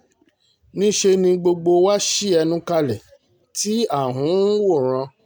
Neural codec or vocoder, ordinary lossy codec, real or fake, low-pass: none; none; real; none